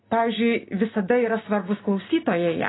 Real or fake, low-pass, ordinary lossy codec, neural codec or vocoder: real; 7.2 kHz; AAC, 16 kbps; none